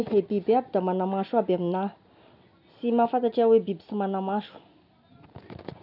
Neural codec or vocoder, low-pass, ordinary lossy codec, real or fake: none; 5.4 kHz; none; real